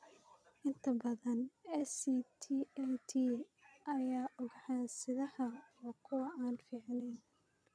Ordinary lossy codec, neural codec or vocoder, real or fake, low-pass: none; vocoder, 22.05 kHz, 80 mel bands, Vocos; fake; none